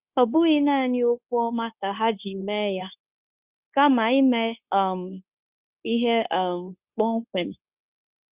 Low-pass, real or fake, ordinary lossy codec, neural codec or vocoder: 3.6 kHz; fake; Opus, 64 kbps; codec, 16 kHz, 0.9 kbps, LongCat-Audio-Codec